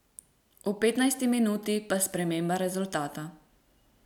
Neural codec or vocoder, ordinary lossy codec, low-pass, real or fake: none; none; 19.8 kHz; real